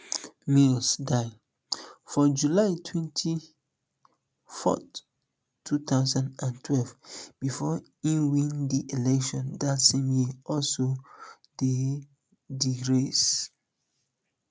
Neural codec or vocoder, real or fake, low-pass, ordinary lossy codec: none; real; none; none